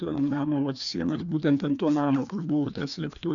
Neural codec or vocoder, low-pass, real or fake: codec, 16 kHz, 2 kbps, FreqCodec, larger model; 7.2 kHz; fake